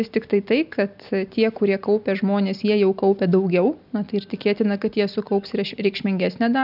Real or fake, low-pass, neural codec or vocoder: real; 5.4 kHz; none